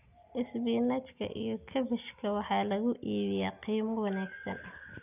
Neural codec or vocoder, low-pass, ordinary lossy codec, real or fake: none; 3.6 kHz; none; real